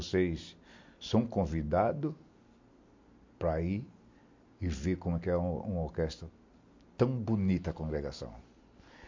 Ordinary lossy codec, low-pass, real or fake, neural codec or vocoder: MP3, 48 kbps; 7.2 kHz; real; none